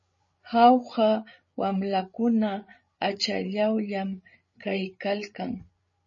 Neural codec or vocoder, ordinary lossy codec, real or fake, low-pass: codec, 16 kHz, 8 kbps, FreqCodec, larger model; MP3, 32 kbps; fake; 7.2 kHz